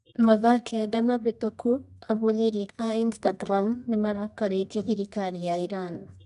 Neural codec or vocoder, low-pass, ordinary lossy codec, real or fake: codec, 24 kHz, 0.9 kbps, WavTokenizer, medium music audio release; 10.8 kHz; none; fake